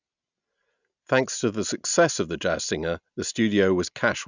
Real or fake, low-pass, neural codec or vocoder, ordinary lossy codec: real; 7.2 kHz; none; none